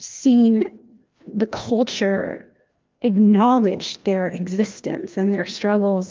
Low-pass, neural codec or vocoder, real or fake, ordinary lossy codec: 7.2 kHz; codec, 16 kHz, 1 kbps, FreqCodec, larger model; fake; Opus, 24 kbps